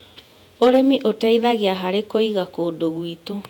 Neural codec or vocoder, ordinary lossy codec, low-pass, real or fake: vocoder, 48 kHz, 128 mel bands, Vocos; none; 19.8 kHz; fake